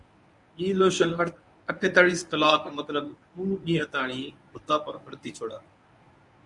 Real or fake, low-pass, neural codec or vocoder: fake; 10.8 kHz; codec, 24 kHz, 0.9 kbps, WavTokenizer, medium speech release version 1